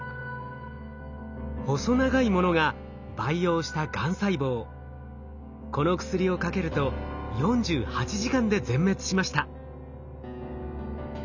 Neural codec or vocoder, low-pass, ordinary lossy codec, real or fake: none; 7.2 kHz; none; real